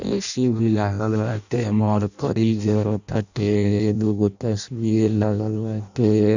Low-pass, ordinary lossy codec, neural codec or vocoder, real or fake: 7.2 kHz; none; codec, 16 kHz in and 24 kHz out, 0.6 kbps, FireRedTTS-2 codec; fake